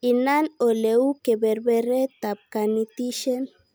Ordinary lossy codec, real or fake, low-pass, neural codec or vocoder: none; real; none; none